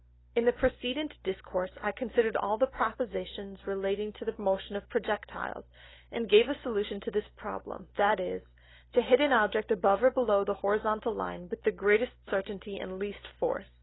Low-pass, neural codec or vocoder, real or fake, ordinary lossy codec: 7.2 kHz; none; real; AAC, 16 kbps